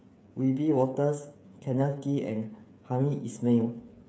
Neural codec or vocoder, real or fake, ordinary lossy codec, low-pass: codec, 16 kHz, 8 kbps, FreqCodec, smaller model; fake; none; none